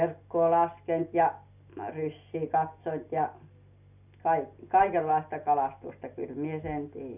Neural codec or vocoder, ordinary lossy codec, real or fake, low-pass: none; none; real; 3.6 kHz